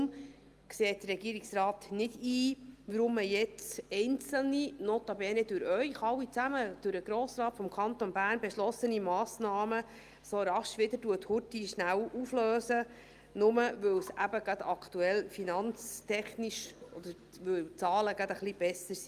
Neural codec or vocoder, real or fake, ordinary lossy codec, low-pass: none; real; Opus, 32 kbps; 14.4 kHz